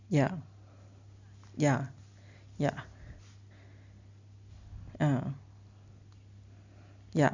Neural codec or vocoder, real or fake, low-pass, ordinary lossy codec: none; real; 7.2 kHz; Opus, 64 kbps